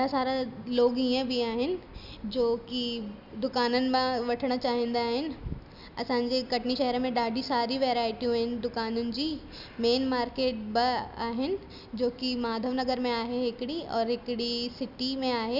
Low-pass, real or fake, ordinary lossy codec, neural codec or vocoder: 5.4 kHz; real; none; none